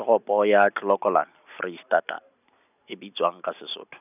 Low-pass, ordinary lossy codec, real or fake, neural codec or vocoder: 3.6 kHz; none; real; none